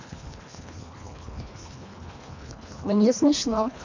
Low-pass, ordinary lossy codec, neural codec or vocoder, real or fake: 7.2 kHz; MP3, 64 kbps; codec, 24 kHz, 1.5 kbps, HILCodec; fake